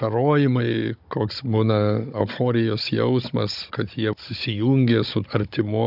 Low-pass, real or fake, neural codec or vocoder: 5.4 kHz; fake; codec, 16 kHz, 16 kbps, FunCodec, trained on Chinese and English, 50 frames a second